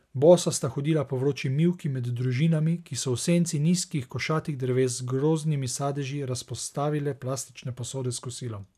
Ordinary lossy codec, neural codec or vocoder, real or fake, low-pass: none; none; real; 14.4 kHz